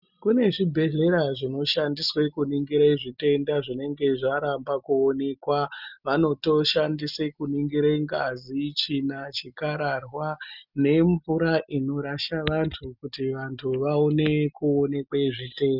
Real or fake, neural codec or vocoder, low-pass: real; none; 5.4 kHz